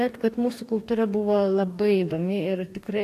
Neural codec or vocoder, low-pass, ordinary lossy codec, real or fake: codec, 44.1 kHz, 2.6 kbps, DAC; 14.4 kHz; AAC, 64 kbps; fake